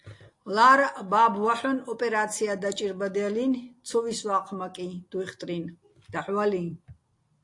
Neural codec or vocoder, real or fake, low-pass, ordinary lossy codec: none; real; 10.8 kHz; AAC, 48 kbps